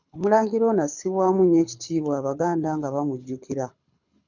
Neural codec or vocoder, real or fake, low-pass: codec, 24 kHz, 6 kbps, HILCodec; fake; 7.2 kHz